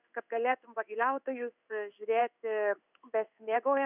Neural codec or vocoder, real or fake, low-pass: codec, 16 kHz in and 24 kHz out, 1 kbps, XY-Tokenizer; fake; 3.6 kHz